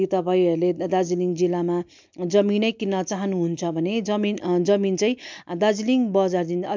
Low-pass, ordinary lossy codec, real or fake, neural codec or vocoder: 7.2 kHz; MP3, 64 kbps; real; none